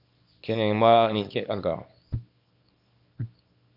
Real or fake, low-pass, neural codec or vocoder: fake; 5.4 kHz; codec, 24 kHz, 0.9 kbps, WavTokenizer, small release